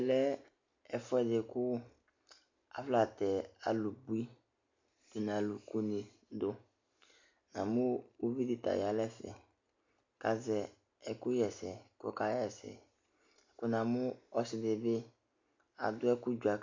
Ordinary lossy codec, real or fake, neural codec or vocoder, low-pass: MP3, 48 kbps; real; none; 7.2 kHz